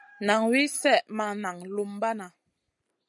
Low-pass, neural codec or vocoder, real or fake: 10.8 kHz; none; real